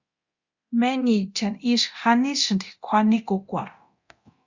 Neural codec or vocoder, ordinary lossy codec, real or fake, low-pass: codec, 24 kHz, 0.9 kbps, DualCodec; Opus, 64 kbps; fake; 7.2 kHz